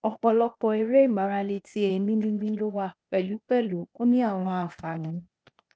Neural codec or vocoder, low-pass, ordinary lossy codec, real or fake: codec, 16 kHz, 0.8 kbps, ZipCodec; none; none; fake